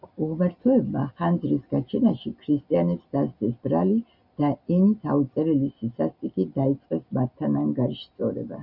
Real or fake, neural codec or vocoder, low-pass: real; none; 5.4 kHz